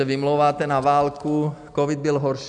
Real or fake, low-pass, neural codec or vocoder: real; 9.9 kHz; none